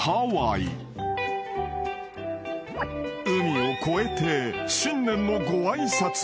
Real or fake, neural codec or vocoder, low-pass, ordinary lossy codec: real; none; none; none